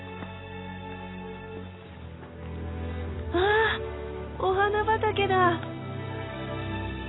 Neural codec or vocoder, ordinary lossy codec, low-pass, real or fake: none; AAC, 16 kbps; 7.2 kHz; real